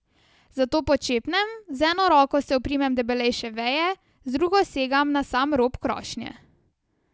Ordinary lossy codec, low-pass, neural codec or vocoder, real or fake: none; none; none; real